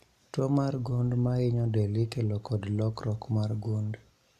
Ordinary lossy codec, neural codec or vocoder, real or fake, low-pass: none; none; real; 14.4 kHz